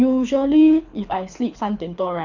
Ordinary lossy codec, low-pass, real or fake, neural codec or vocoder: none; 7.2 kHz; fake; codec, 24 kHz, 6 kbps, HILCodec